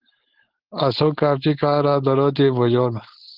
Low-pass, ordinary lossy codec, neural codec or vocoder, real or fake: 5.4 kHz; Opus, 16 kbps; codec, 16 kHz, 4.8 kbps, FACodec; fake